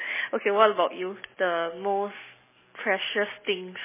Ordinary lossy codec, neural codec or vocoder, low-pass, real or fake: MP3, 16 kbps; none; 3.6 kHz; real